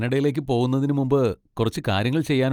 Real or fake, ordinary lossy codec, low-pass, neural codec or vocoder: real; Opus, 32 kbps; 19.8 kHz; none